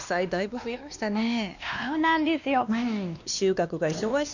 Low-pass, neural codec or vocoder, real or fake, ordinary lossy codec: 7.2 kHz; codec, 16 kHz, 2 kbps, X-Codec, WavLM features, trained on Multilingual LibriSpeech; fake; none